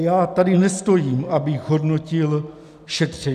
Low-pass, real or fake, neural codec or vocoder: 14.4 kHz; real; none